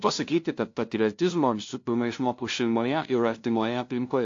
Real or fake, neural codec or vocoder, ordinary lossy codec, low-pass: fake; codec, 16 kHz, 0.5 kbps, FunCodec, trained on LibriTTS, 25 frames a second; AAC, 48 kbps; 7.2 kHz